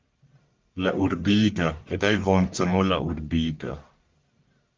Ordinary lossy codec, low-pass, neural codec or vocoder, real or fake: Opus, 24 kbps; 7.2 kHz; codec, 44.1 kHz, 1.7 kbps, Pupu-Codec; fake